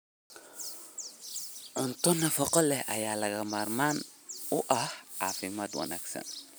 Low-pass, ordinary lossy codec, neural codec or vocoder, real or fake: none; none; none; real